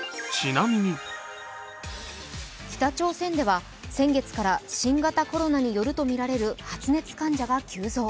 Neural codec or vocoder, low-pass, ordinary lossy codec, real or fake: none; none; none; real